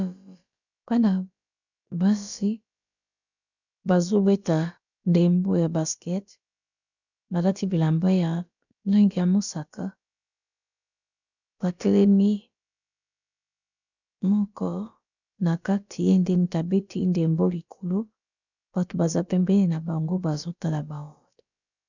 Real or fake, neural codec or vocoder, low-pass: fake; codec, 16 kHz, about 1 kbps, DyCAST, with the encoder's durations; 7.2 kHz